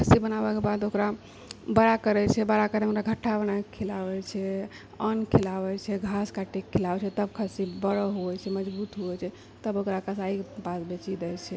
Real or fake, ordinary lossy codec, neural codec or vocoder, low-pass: real; none; none; none